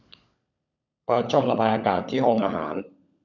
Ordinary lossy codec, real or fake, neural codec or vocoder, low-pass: none; fake; codec, 16 kHz, 16 kbps, FunCodec, trained on LibriTTS, 50 frames a second; 7.2 kHz